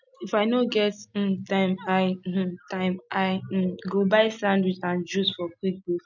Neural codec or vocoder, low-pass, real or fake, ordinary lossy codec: none; 7.2 kHz; real; none